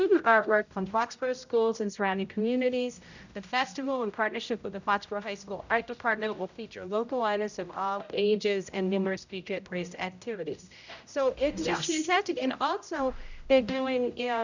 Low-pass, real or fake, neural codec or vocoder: 7.2 kHz; fake; codec, 16 kHz, 0.5 kbps, X-Codec, HuBERT features, trained on general audio